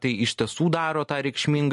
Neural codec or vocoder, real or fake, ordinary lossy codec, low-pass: none; real; MP3, 48 kbps; 14.4 kHz